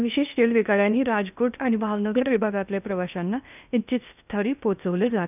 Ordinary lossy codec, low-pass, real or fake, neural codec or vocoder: none; 3.6 kHz; fake; codec, 16 kHz in and 24 kHz out, 0.6 kbps, FocalCodec, streaming, 2048 codes